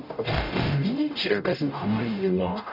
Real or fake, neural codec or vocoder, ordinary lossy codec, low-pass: fake; codec, 44.1 kHz, 0.9 kbps, DAC; none; 5.4 kHz